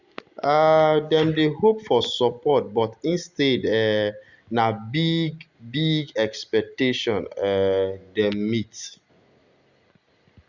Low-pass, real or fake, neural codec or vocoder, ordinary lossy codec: 7.2 kHz; real; none; Opus, 64 kbps